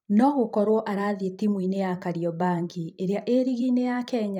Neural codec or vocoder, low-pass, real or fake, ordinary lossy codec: vocoder, 44.1 kHz, 128 mel bands every 512 samples, BigVGAN v2; 19.8 kHz; fake; none